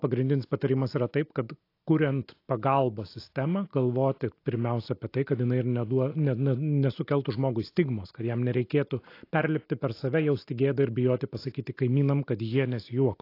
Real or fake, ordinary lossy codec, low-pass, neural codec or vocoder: real; AAC, 32 kbps; 5.4 kHz; none